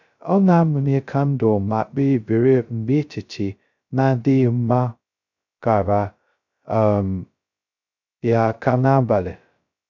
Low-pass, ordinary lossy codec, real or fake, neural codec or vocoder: 7.2 kHz; none; fake; codec, 16 kHz, 0.2 kbps, FocalCodec